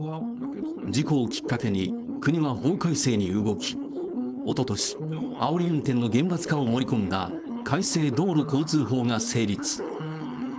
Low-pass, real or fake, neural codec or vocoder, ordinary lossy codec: none; fake; codec, 16 kHz, 4.8 kbps, FACodec; none